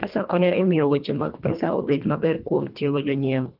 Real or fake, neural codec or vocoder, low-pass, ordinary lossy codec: fake; codec, 24 kHz, 1.5 kbps, HILCodec; 5.4 kHz; Opus, 24 kbps